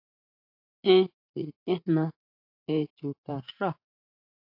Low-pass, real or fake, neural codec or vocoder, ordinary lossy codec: 5.4 kHz; fake; vocoder, 44.1 kHz, 80 mel bands, Vocos; AAC, 48 kbps